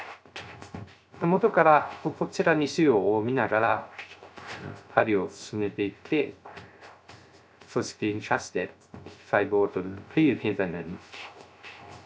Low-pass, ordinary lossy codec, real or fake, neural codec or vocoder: none; none; fake; codec, 16 kHz, 0.3 kbps, FocalCodec